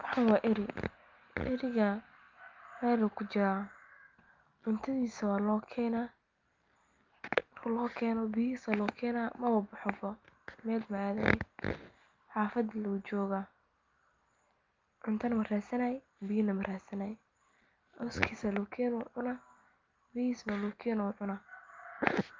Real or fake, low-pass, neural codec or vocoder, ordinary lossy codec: real; 7.2 kHz; none; Opus, 32 kbps